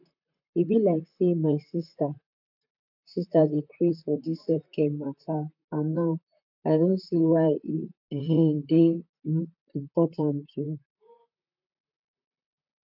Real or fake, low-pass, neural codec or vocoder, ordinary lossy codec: fake; 5.4 kHz; vocoder, 44.1 kHz, 128 mel bands, Pupu-Vocoder; none